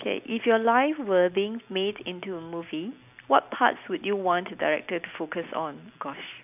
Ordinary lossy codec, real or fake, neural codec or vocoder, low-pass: none; real; none; 3.6 kHz